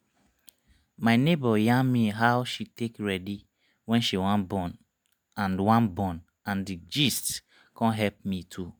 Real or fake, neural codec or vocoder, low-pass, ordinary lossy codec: real; none; none; none